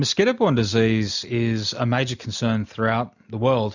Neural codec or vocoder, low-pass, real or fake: none; 7.2 kHz; real